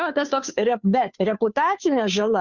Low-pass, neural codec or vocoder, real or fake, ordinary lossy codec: 7.2 kHz; codec, 16 kHz, 2 kbps, FunCodec, trained on Chinese and English, 25 frames a second; fake; Opus, 64 kbps